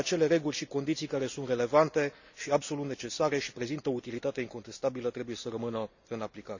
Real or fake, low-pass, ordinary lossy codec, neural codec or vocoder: real; 7.2 kHz; none; none